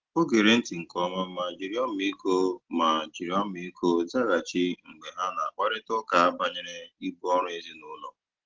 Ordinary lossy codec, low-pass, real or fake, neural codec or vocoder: Opus, 16 kbps; 7.2 kHz; real; none